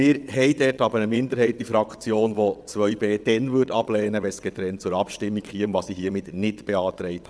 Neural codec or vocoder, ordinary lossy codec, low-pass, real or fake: vocoder, 22.05 kHz, 80 mel bands, WaveNeXt; none; none; fake